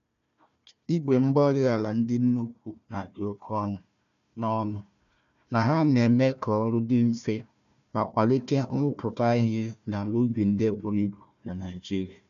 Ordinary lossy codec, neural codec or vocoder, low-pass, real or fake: MP3, 96 kbps; codec, 16 kHz, 1 kbps, FunCodec, trained on Chinese and English, 50 frames a second; 7.2 kHz; fake